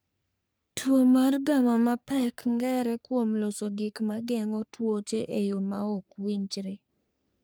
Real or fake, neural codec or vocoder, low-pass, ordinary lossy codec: fake; codec, 44.1 kHz, 3.4 kbps, Pupu-Codec; none; none